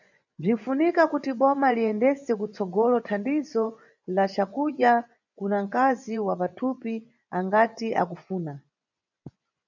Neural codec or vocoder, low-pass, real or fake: vocoder, 24 kHz, 100 mel bands, Vocos; 7.2 kHz; fake